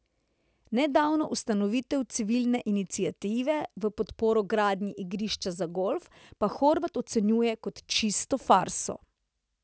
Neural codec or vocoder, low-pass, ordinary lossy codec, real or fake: none; none; none; real